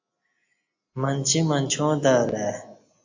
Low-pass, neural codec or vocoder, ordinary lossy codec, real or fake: 7.2 kHz; none; AAC, 48 kbps; real